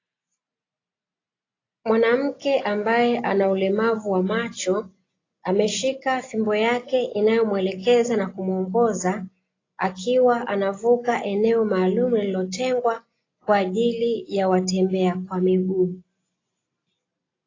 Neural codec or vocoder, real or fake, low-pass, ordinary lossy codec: none; real; 7.2 kHz; AAC, 32 kbps